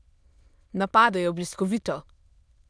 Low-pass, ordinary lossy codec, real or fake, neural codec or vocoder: none; none; fake; autoencoder, 22.05 kHz, a latent of 192 numbers a frame, VITS, trained on many speakers